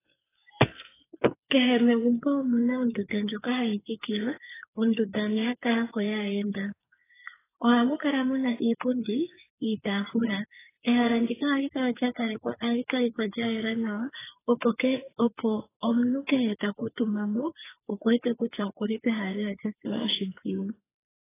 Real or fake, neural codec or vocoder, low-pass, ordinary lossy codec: fake; codec, 44.1 kHz, 2.6 kbps, SNAC; 3.6 kHz; AAC, 16 kbps